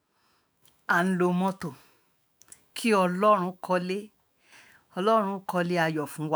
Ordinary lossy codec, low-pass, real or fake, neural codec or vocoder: none; none; fake; autoencoder, 48 kHz, 128 numbers a frame, DAC-VAE, trained on Japanese speech